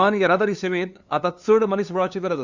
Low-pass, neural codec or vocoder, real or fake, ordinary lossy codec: 7.2 kHz; codec, 16 kHz, 2 kbps, FunCodec, trained on LibriTTS, 25 frames a second; fake; Opus, 64 kbps